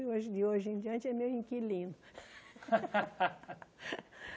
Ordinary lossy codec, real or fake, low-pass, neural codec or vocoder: none; real; none; none